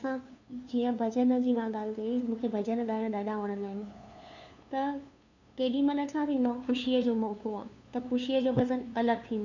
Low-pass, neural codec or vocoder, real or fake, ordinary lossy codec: 7.2 kHz; codec, 16 kHz, 2 kbps, FunCodec, trained on LibriTTS, 25 frames a second; fake; none